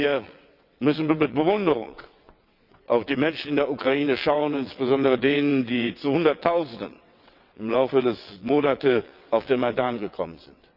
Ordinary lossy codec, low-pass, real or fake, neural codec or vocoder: none; 5.4 kHz; fake; vocoder, 22.05 kHz, 80 mel bands, WaveNeXt